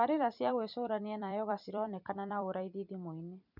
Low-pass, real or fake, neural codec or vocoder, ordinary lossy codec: 5.4 kHz; fake; vocoder, 44.1 kHz, 128 mel bands every 256 samples, BigVGAN v2; none